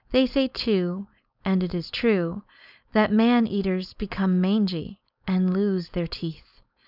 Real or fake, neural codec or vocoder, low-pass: fake; codec, 16 kHz, 4.8 kbps, FACodec; 5.4 kHz